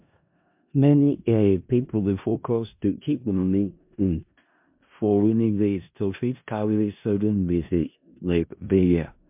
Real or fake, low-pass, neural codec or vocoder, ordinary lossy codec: fake; 3.6 kHz; codec, 16 kHz in and 24 kHz out, 0.4 kbps, LongCat-Audio-Codec, four codebook decoder; MP3, 32 kbps